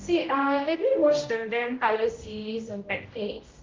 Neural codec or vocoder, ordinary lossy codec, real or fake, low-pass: codec, 16 kHz, 0.5 kbps, X-Codec, HuBERT features, trained on general audio; Opus, 32 kbps; fake; 7.2 kHz